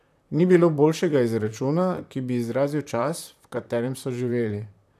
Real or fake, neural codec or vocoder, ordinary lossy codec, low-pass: fake; vocoder, 44.1 kHz, 128 mel bands, Pupu-Vocoder; none; 14.4 kHz